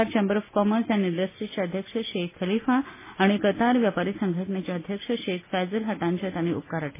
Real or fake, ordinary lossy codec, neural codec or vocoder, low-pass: real; MP3, 16 kbps; none; 3.6 kHz